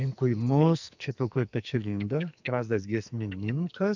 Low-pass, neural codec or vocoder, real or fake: 7.2 kHz; codec, 44.1 kHz, 2.6 kbps, SNAC; fake